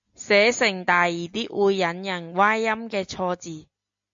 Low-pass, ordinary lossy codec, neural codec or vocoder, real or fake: 7.2 kHz; AAC, 64 kbps; none; real